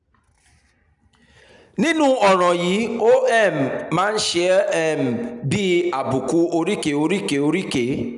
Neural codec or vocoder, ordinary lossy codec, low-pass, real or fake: none; none; 10.8 kHz; real